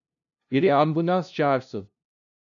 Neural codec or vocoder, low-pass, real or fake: codec, 16 kHz, 0.5 kbps, FunCodec, trained on LibriTTS, 25 frames a second; 7.2 kHz; fake